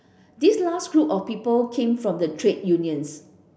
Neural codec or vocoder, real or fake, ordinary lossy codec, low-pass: none; real; none; none